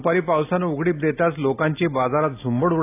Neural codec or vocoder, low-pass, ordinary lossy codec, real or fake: none; 3.6 kHz; AAC, 24 kbps; real